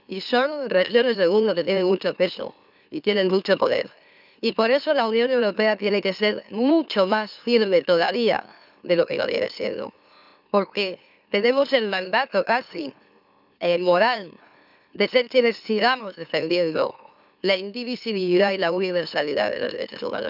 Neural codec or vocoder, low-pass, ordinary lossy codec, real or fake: autoencoder, 44.1 kHz, a latent of 192 numbers a frame, MeloTTS; 5.4 kHz; none; fake